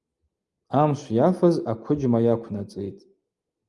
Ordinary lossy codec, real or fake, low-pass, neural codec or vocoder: Opus, 32 kbps; real; 10.8 kHz; none